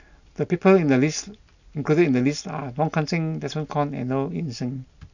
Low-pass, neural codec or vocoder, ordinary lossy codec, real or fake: 7.2 kHz; none; none; real